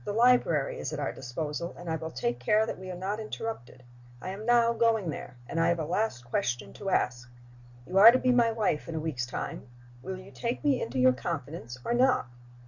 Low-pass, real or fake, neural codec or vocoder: 7.2 kHz; real; none